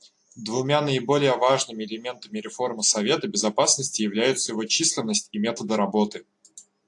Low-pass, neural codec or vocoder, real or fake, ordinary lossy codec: 10.8 kHz; none; real; AAC, 64 kbps